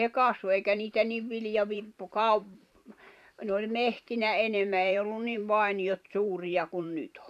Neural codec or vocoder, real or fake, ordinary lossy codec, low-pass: vocoder, 44.1 kHz, 128 mel bands every 512 samples, BigVGAN v2; fake; none; 14.4 kHz